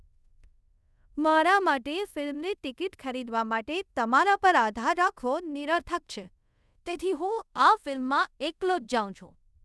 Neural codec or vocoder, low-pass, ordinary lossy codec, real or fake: codec, 24 kHz, 0.5 kbps, DualCodec; none; none; fake